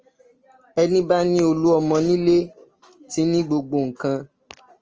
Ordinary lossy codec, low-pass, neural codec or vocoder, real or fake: Opus, 24 kbps; 7.2 kHz; none; real